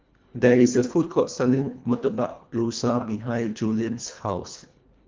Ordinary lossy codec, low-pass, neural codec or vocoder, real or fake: Opus, 32 kbps; 7.2 kHz; codec, 24 kHz, 1.5 kbps, HILCodec; fake